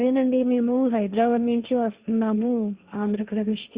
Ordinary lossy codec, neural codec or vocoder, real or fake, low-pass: Opus, 64 kbps; codec, 16 kHz, 1.1 kbps, Voila-Tokenizer; fake; 3.6 kHz